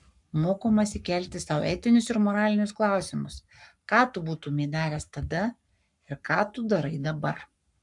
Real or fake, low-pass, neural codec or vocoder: fake; 10.8 kHz; codec, 44.1 kHz, 7.8 kbps, Pupu-Codec